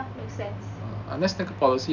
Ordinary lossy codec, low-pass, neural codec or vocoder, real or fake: none; 7.2 kHz; none; real